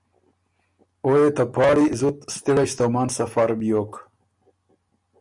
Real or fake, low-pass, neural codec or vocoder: real; 10.8 kHz; none